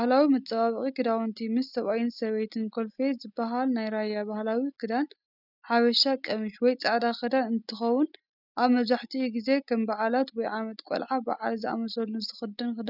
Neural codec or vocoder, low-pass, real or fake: none; 5.4 kHz; real